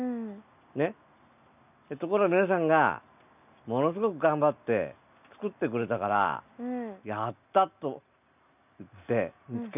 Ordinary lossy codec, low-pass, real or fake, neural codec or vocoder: none; 3.6 kHz; real; none